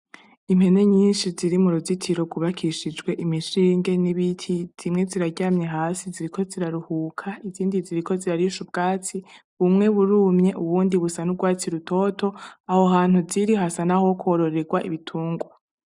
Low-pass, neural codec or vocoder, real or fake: 10.8 kHz; none; real